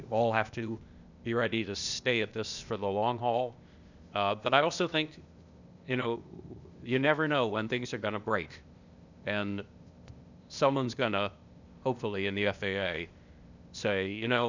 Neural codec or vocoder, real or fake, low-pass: codec, 16 kHz, 0.8 kbps, ZipCodec; fake; 7.2 kHz